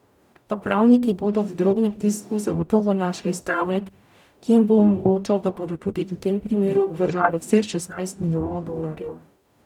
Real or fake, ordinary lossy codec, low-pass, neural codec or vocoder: fake; none; 19.8 kHz; codec, 44.1 kHz, 0.9 kbps, DAC